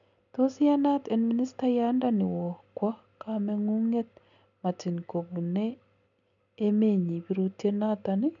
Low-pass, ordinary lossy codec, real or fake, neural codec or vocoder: 7.2 kHz; none; real; none